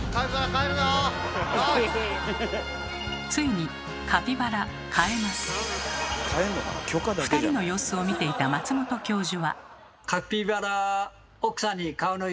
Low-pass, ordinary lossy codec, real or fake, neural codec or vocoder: none; none; real; none